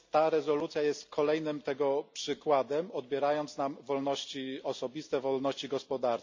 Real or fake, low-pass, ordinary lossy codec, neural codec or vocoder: real; 7.2 kHz; MP3, 64 kbps; none